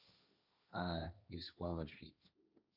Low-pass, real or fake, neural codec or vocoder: 5.4 kHz; fake; codec, 16 kHz, 1.1 kbps, Voila-Tokenizer